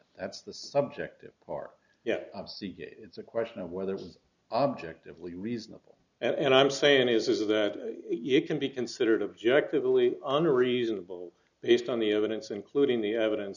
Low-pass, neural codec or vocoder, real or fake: 7.2 kHz; none; real